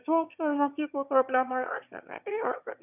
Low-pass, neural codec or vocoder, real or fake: 3.6 kHz; autoencoder, 22.05 kHz, a latent of 192 numbers a frame, VITS, trained on one speaker; fake